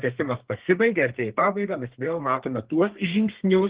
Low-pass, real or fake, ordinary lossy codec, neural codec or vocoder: 3.6 kHz; fake; Opus, 16 kbps; codec, 32 kHz, 1.9 kbps, SNAC